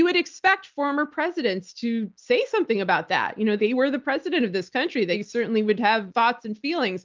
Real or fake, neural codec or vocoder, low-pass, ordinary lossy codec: real; none; 7.2 kHz; Opus, 32 kbps